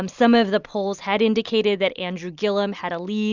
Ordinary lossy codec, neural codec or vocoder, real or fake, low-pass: Opus, 64 kbps; none; real; 7.2 kHz